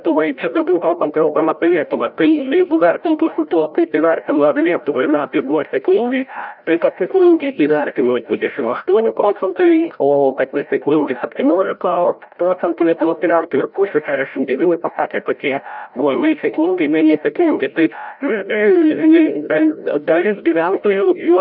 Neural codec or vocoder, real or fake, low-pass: codec, 16 kHz, 0.5 kbps, FreqCodec, larger model; fake; 5.4 kHz